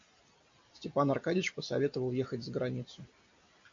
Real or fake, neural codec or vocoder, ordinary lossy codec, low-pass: real; none; MP3, 48 kbps; 7.2 kHz